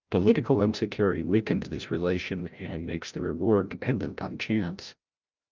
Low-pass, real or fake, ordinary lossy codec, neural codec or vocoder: 7.2 kHz; fake; Opus, 24 kbps; codec, 16 kHz, 0.5 kbps, FreqCodec, larger model